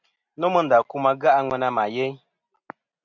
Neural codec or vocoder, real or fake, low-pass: none; real; 7.2 kHz